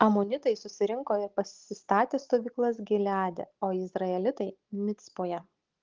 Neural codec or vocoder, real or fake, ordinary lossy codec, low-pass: none; real; Opus, 16 kbps; 7.2 kHz